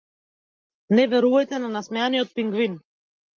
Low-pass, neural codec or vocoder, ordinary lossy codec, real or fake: 7.2 kHz; none; Opus, 32 kbps; real